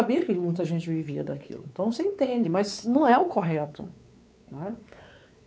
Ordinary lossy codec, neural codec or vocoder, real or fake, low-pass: none; codec, 16 kHz, 4 kbps, X-Codec, WavLM features, trained on Multilingual LibriSpeech; fake; none